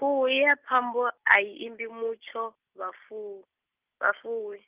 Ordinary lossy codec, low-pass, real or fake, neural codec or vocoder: Opus, 32 kbps; 3.6 kHz; real; none